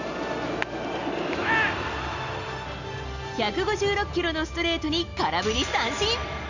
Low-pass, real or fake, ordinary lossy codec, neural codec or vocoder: 7.2 kHz; real; Opus, 64 kbps; none